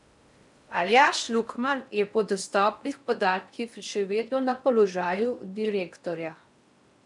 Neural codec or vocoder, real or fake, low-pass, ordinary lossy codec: codec, 16 kHz in and 24 kHz out, 0.6 kbps, FocalCodec, streaming, 4096 codes; fake; 10.8 kHz; none